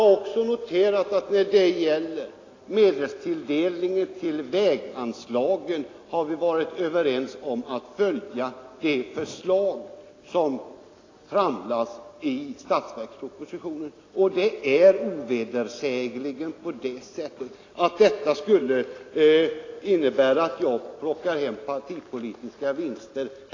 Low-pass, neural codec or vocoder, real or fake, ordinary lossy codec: 7.2 kHz; none; real; AAC, 32 kbps